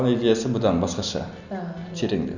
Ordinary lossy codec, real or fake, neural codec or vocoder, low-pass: none; real; none; 7.2 kHz